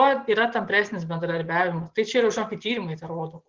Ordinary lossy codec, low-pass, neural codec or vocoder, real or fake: Opus, 16 kbps; 7.2 kHz; none; real